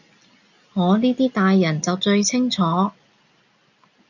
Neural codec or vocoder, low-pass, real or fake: none; 7.2 kHz; real